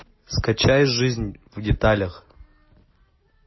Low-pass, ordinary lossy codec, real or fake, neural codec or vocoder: 7.2 kHz; MP3, 24 kbps; real; none